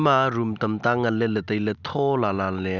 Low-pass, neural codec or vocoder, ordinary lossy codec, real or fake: 7.2 kHz; none; none; real